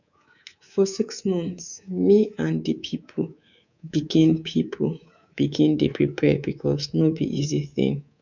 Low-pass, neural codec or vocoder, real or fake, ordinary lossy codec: 7.2 kHz; codec, 24 kHz, 3.1 kbps, DualCodec; fake; none